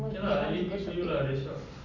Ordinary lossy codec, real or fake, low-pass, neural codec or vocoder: MP3, 64 kbps; real; 7.2 kHz; none